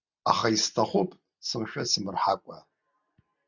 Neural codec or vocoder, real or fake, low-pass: none; real; 7.2 kHz